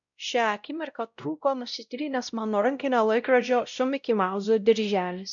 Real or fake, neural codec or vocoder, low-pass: fake; codec, 16 kHz, 0.5 kbps, X-Codec, WavLM features, trained on Multilingual LibriSpeech; 7.2 kHz